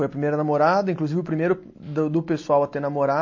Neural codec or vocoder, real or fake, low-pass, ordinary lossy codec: none; real; 7.2 kHz; MP3, 32 kbps